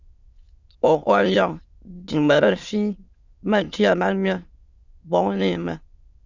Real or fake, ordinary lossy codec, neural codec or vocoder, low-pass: fake; Opus, 64 kbps; autoencoder, 22.05 kHz, a latent of 192 numbers a frame, VITS, trained on many speakers; 7.2 kHz